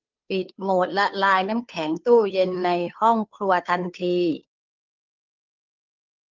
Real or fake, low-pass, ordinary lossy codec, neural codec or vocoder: fake; 7.2 kHz; Opus, 32 kbps; codec, 16 kHz, 2 kbps, FunCodec, trained on Chinese and English, 25 frames a second